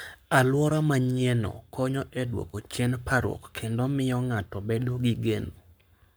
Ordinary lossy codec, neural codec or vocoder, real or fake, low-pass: none; codec, 44.1 kHz, 7.8 kbps, Pupu-Codec; fake; none